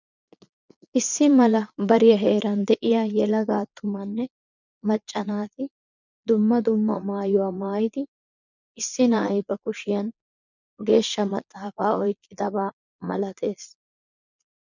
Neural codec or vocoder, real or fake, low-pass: vocoder, 22.05 kHz, 80 mel bands, Vocos; fake; 7.2 kHz